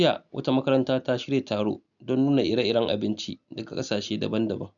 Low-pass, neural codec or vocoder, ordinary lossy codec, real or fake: 7.2 kHz; none; none; real